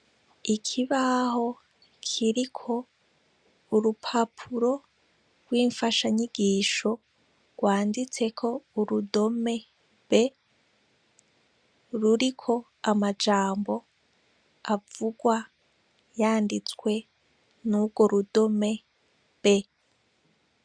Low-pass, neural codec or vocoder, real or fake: 9.9 kHz; none; real